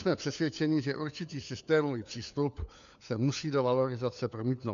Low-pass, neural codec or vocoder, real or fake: 7.2 kHz; codec, 16 kHz, 4 kbps, FunCodec, trained on LibriTTS, 50 frames a second; fake